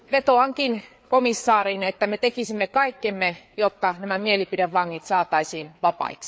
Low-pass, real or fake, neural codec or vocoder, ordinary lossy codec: none; fake; codec, 16 kHz, 4 kbps, FreqCodec, larger model; none